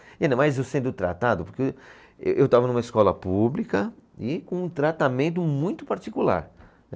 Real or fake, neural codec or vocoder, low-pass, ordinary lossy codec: real; none; none; none